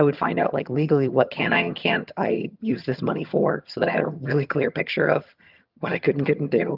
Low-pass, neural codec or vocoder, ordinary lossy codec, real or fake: 5.4 kHz; vocoder, 22.05 kHz, 80 mel bands, HiFi-GAN; Opus, 24 kbps; fake